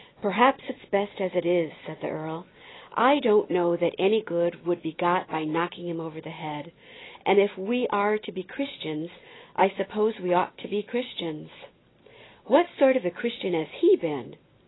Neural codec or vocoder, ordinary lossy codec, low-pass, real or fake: none; AAC, 16 kbps; 7.2 kHz; real